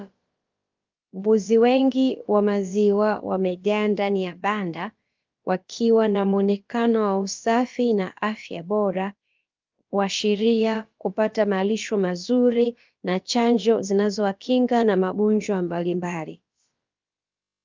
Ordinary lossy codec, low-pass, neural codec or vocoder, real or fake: Opus, 24 kbps; 7.2 kHz; codec, 16 kHz, about 1 kbps, DyCAST, with the encoder's durations; fake